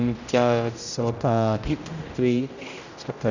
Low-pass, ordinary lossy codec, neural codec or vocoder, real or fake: 7.2 kHz; none; codec, 16 kHz, 0.5 kbps, X-Codec, HuBERT features, trained on general audio; fake